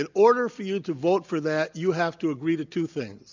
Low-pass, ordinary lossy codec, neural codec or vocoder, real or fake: 7.2 kHz; MP3, 64 kbps; none; real